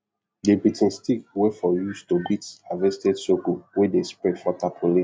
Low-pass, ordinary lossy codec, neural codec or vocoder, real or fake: none; none; none; real